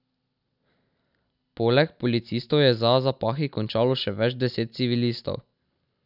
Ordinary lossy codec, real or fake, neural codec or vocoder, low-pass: none; real; none; 5.4 kHz